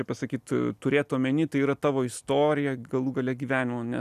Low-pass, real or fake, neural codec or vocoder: 14.4 kHz; real; none